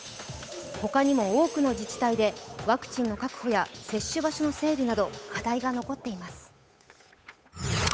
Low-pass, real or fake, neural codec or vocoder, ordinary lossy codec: none; fake; codec, 16 kHz, 8 kbps, FunCodec, trained on Chinese and English, 25 frames a second; none